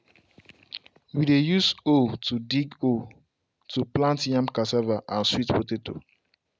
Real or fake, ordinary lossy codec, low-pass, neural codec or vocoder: real; none; none; none